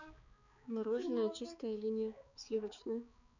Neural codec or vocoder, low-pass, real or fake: codec, 16 kHz, 4 kbps, X-Codec, HuBERT features, trained on balanced general audio; 7.2 kHz; fake